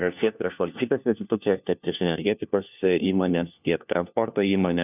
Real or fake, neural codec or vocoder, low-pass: fake; codec, 16 kHz, 1 kbps, FunCodec, trained on LibriTTS, 50 frames a second; 3.6 kHz